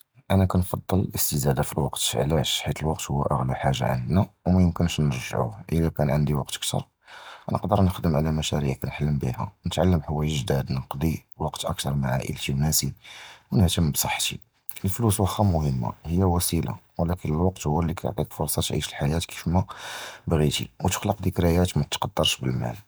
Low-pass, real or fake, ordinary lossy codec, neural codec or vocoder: none; real; none; none